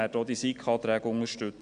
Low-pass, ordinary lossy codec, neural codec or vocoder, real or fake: 9.9 kHz; AAC, 64 kbps; none; real